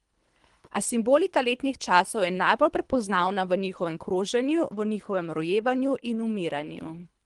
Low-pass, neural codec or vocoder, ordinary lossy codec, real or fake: 10.8 kHz; codec, 24 kHz, 3 kbps, HILCodec; Opus, 24 kbps; fake